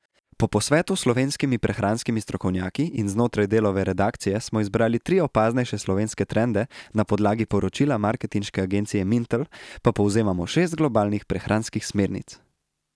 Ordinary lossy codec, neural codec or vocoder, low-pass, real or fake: none; none; none; real